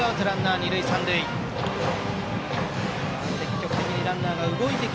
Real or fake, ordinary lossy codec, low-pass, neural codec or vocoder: real; none; none; none